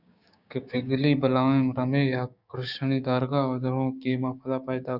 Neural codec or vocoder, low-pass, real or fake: codec, 16 kHz, 6 kbps, DAC; 5.4 kHz; fake